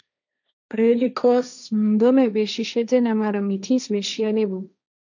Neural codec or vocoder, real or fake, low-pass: codec, 16 kHz, 1.1 kbps, Voila-Tokenizer; fake; 7.2 kHz